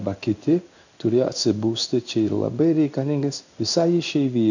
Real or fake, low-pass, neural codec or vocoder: fake; 7.2 kHz; codec, 16 kHz in and 24 kHz out, 1 kbps, XY-Tokenizer